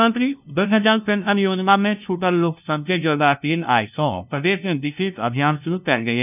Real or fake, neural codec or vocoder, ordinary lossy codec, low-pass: fake; codec, 16 kHz, 0.5 kbps, FunCodec, trained on LibriTTS, 25 frames a second; none; 3.6 kHz